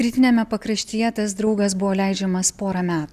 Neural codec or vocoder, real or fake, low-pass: none; real; 14.4 kHz